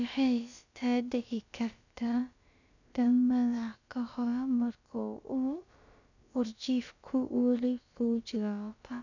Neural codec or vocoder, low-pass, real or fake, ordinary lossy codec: codec, 16 kHz, about 1 kbps, DyCAST, with the encoder's durations; 7.2 kHz; fake; none